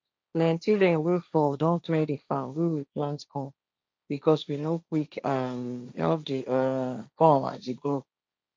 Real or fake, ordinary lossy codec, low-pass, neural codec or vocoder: fake; none; none; codec, 16 kHz, 1.1 kbps, Voila-Tokenizer